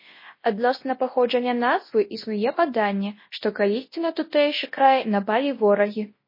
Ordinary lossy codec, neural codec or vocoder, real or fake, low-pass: MP3, 24 kbps; codec, 24 kHz, 0.9 kbps, WavTokenizer, large speech release; fake; 5.4 kHz